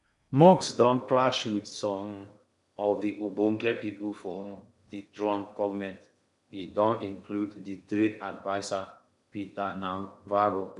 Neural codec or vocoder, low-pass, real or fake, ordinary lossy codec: codec, 16 kHz in and 24 kHz out, 0.8 kbps, FocalCodec, streaming, 65536 codes; 10.8 kHz; fake; none